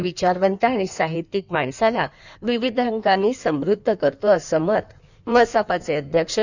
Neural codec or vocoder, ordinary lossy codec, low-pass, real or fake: codec, 16 kHz in and 24 kHz out, 1.1 kbps, FireRedTTS-2 codec; none; 7.2 kHz; fake